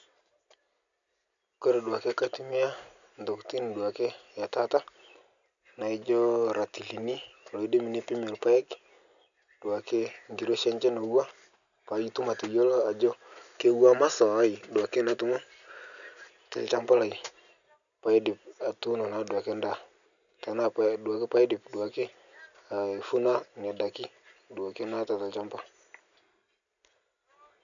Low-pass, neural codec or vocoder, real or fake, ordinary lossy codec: 7.2 kHz; none; real; none